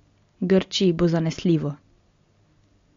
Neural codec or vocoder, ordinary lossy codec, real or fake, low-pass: none; MP3, 48 kbps; real; 7.2 kHz